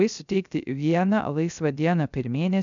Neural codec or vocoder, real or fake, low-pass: codec, 16 kHz, 0.3 kbps, FocalCodec; fake; 7.2 kHz